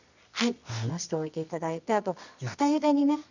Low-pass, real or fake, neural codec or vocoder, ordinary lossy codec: 7.2 kHz; fake; codec, 24 kHz, 0.9 kbps, WavTokenizer, medium music audio release; none